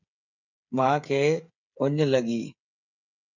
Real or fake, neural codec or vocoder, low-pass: fake; codec, 16 kHz, 8 kbps, FreqCodec, smaller model; 7.2 kHz